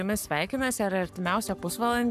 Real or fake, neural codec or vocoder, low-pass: fake; codec, 44.1 kHz, 7.8 kbps, Pupu-Codec; 14.4 kHz